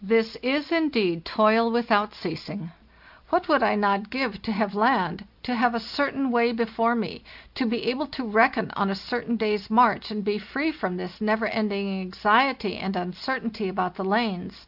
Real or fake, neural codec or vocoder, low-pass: real; none; 5.4 kHz